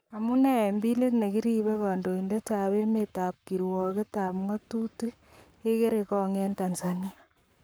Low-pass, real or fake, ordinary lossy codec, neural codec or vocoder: none; fake; none; codec, 44.1 kHz, 7.8 kbps, Pupu-Codec